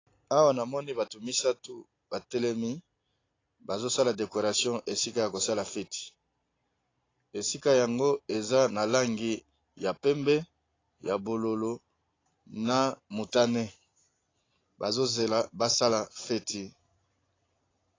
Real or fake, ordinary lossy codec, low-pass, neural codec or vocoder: real; AAC, 32 kbps; 7.2 kHz; none